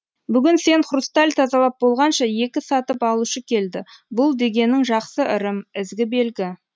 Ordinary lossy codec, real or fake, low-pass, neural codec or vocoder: none; real; none; none